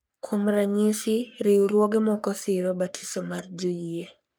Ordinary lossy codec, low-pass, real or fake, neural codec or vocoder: none; none; fake; codec, 44.1 kHz, 3.4 kbps, Pupu-Codec